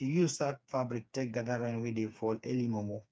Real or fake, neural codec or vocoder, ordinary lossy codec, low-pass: fake; codec, 16 kHz, 4 kbps, FreqCodec, smaller model; none; none